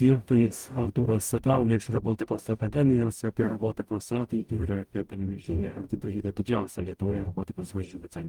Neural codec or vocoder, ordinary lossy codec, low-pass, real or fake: codec, 44.1 kHz, 0.9 kbps, DAC; Opus, 32 kbps; 19.8 kHz; fake